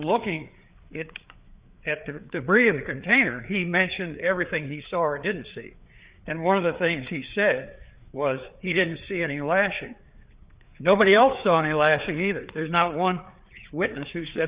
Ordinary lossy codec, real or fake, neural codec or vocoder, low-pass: Opus, 64 kbps; fake; codec, 16 kHz, 4 kbps, FreqCodec, larger model; 3.6 kHz